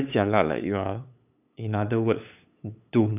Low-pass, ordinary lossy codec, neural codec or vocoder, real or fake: 3.6 kHz; none; codec, 16 kHz, 8 kbps, FunCodec, trained on LibriTTS, 25 frames a second; fake